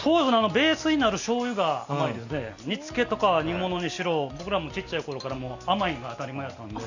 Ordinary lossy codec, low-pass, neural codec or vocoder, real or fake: none; 7.2 kHz; none; real